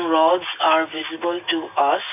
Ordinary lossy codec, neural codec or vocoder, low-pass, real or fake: none; none; 3.6 kHz; real